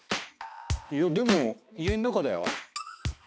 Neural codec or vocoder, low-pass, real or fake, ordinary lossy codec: codec, 16 kHz, 2 kbps, X-Codec, HuBERT features, trained on balanced general audio; none; fake; none